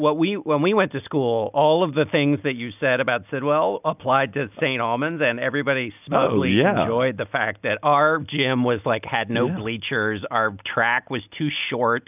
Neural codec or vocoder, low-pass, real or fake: none; 3.6 kHz; real